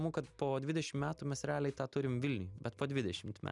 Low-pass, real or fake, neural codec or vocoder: 10.8 kHz; real; none